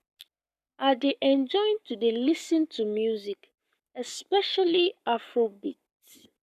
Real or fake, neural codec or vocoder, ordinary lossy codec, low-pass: fake; codec, 44.1 kHz, 7.8 kbps, Pupu-Codec; none; 14.4 kHz